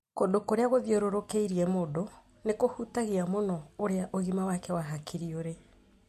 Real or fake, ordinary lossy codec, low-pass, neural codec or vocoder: real; MP3, 64 kbps; 14.4 kHz; none